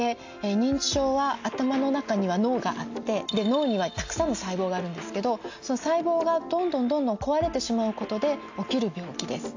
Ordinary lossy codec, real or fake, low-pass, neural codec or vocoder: AAC, 48 kbps; real; 7.2 kHz; none